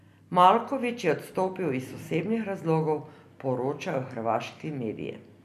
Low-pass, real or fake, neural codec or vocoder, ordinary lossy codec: 14.4 kHz; real; none; none